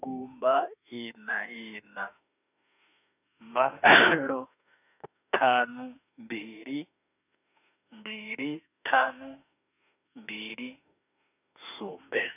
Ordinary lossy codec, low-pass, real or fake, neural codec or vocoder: none; 3.6 kHz; fake; autoencoder, 48 kHz, 32 numbers a frame, DAC-VAE, trained on Japanese speech